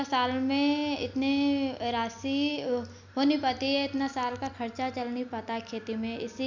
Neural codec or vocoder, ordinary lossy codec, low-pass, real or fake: none; none; 7.2 kHz; real